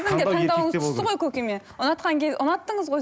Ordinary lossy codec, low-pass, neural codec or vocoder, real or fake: none; none; none; real